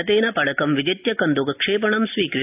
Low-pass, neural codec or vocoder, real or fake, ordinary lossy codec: 3.6 kHz; none; real; AAC, 32 kbps